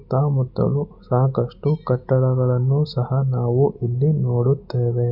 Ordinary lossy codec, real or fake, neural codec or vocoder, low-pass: none; real; none; 5.4 kHz